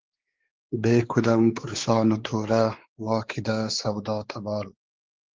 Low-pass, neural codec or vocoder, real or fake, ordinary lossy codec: 7.2 kHz; codec, 16 kHz, 4 kbps, X-Codec, WavLM features, trained on Multilingual LibriSpeech; fake; Opus, 16 kbps